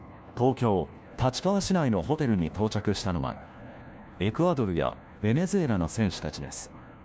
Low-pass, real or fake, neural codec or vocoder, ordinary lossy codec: none; fake; codec, 16 kHz, 1 kbps, FunCodec, trained on LibriTTS, 50 frames a second; none